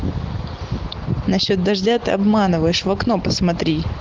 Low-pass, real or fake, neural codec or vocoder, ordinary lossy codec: 7.2 kHz; real; none; Opus, 16 kbps